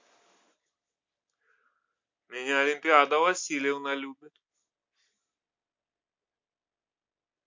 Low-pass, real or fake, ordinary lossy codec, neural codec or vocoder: 7.2 kHz; real; MP3, 48 kbps; none